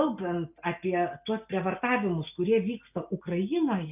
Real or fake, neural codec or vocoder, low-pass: real; none; 3.6 kHz